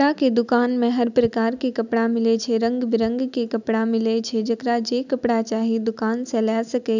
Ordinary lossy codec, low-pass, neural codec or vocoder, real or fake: none; 7.2 kHz; none; real